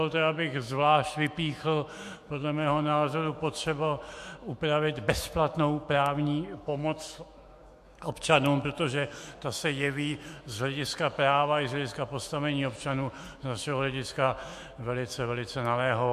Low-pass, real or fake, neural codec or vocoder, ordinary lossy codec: 14.4 kHz; fake; autoencoder, 48 kHz, 128 numbers a frame, DAC-VAE, trained on Japanese speech; MP3, 64 kbps